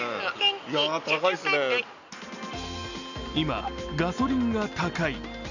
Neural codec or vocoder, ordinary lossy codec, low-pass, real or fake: none; none; 7.2 kHz; real